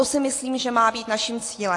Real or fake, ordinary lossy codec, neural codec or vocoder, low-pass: real; AAC, 48 kbps; none; 10.8 kHz